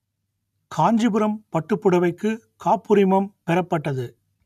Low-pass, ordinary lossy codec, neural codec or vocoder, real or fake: 14.4 kHz; none; none; real